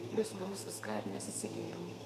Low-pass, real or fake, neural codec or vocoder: 14.4 kHz; fake; codec, 32 kHz, 1.9 kbps, SNAC